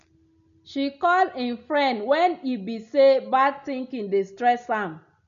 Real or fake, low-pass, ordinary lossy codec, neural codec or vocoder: real; 7.2 kHz; none; none